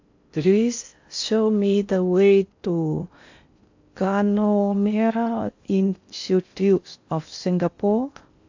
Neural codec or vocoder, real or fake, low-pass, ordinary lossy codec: codec, 16 kHz in and 24 kHz out, 0.6 kbps, FocalCodec, streaming, 4096 codes; fake; 7.2 kHz; AAC, 48 kbps